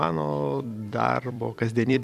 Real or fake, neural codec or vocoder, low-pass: fake; vocoder, 44.1 kHz, 128 mel bands every 256 samples, BigVGAN v2; 14.4 kHz